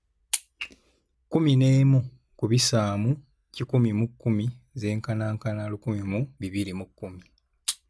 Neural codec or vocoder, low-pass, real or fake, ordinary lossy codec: none; none; real; none